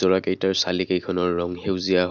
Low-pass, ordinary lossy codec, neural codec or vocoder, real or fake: 7.2 kHz; none; none; real